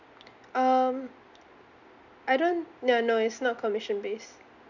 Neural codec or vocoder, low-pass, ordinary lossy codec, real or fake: none; 7.2 kHz; none; real